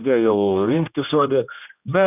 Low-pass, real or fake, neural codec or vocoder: 3.6 kHz; fake; codec, 16 kHz, 1 kbps, X-Codec, HuBERT features, trained on general audio